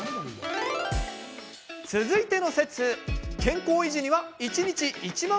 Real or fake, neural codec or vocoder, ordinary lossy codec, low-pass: real; none; none; none